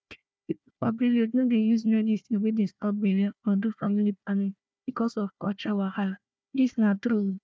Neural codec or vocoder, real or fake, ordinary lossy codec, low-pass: codec, 16 kHz, 1 kbps, FunCodec, trained on Chinese and English, 50 frames a second; fake; none; none